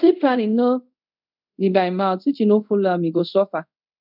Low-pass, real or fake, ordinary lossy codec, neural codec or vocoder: 5.4 kHz; fake; none; codec, 24 kHz, 0.5 kbps, DualCodec